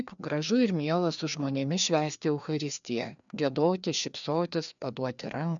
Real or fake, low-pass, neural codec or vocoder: fake; 7.2 kHz; codec, 16 kHz, 2 kbps, FreqCodec, larger model